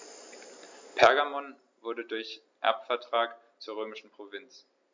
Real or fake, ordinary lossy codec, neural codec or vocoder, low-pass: real; MP3, 64 kbps; none; 7.2 kHz